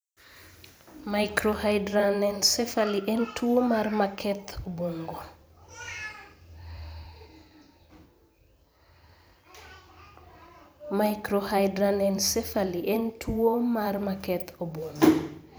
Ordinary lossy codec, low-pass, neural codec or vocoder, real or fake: none; none; vocoder, 44.1 kHz, 128 mel bands every 512 samples, BigVGAN v2; fake